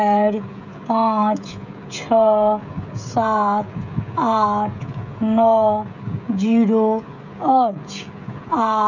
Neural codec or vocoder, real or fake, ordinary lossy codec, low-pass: codec, 16 kHz, 16 kbps, FreqCodec, smaller model; fake; none; 7.2 kHz